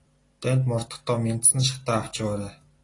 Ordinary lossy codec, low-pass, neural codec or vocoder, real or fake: AAC, 32 kbps; 10.8 kHz; none; real